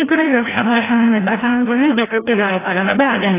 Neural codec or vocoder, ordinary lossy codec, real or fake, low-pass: codec, 16 kHz, 0.5 kbps, FreqCodec, larger model; AAC, 16 kbps; fake; 3.6 kHz